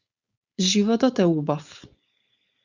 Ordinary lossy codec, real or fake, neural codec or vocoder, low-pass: Opus, 64 kbps; fake; codec, 16 kHz, 4.8 kbps, FACodec; 7.2 kHz